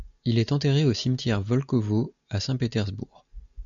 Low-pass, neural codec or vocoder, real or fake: 7.2 kHz; none; real